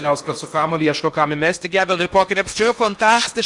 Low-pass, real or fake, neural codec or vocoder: 10.8 kHz; fake; codec, 16 kHz in and 24 kHz out, 0.8 kbps, FocalCodec, streaming, 65536 codes